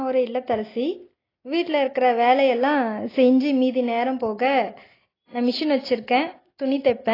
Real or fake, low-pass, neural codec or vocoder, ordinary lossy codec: real; 5.4 kHz; none; AAC, 24 kbps